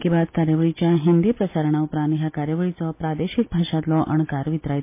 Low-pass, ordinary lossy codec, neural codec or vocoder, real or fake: 3.6 kHz; MP3, 24 kbps; none; real